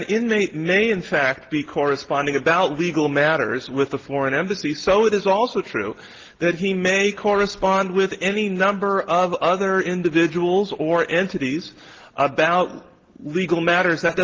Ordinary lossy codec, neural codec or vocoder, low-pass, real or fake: Opus, 16 kbps; none; 7.2 kHz; real